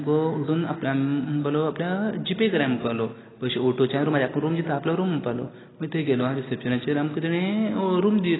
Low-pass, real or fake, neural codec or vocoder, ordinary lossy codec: 7.2 kHz; real; none; AAC, 16 kbps